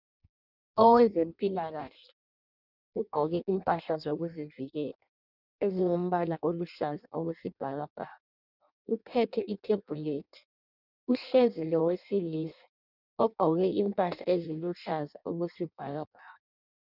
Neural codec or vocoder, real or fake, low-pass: codec, 16 kHz in and 24 kHz out, 0.6 kbps, FireRedTTS-2 codec; fake; 5.4 kHz